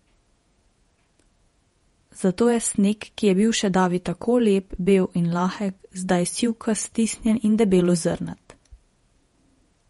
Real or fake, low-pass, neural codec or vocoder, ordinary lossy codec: fake; 19.8 kHz; vocoder, 48 kHz, 128 mel bands, Vocos; MP3, 48 kbps